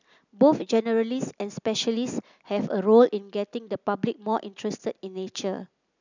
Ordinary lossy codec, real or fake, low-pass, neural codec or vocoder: none; real; 7.2 kHz; none